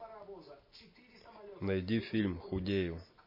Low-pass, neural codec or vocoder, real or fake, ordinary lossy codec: 5.4 kHz; none; real; MP3, 24 kbps